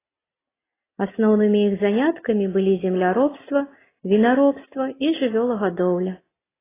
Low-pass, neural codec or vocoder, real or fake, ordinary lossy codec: 3.6 kHz; none; real; AAC, 16 kbps